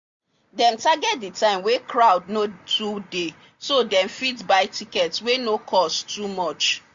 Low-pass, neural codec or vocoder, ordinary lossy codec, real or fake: 7.2 kHz; none; MP3, 48 kbps; real